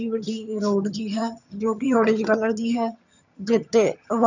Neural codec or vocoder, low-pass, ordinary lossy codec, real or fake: vocoder, 22.05 kHz, 80 mel bands, HiFi-GAN; 7.2 kHz; none; fake